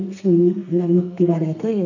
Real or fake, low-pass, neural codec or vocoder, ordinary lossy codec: fake; 7.2 kHz; codec, 32 kHz, 1.9 kbps, SNAC; none